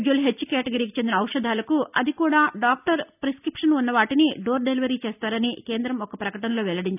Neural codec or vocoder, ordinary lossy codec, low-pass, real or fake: none; none; 3.6 kHz; real